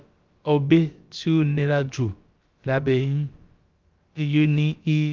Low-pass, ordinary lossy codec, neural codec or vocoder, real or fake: 7.2 kHz; Opus, 32 kbps; codec, 16 kHz, about 1 kbps, DyCAST, with the encoder's durations; fake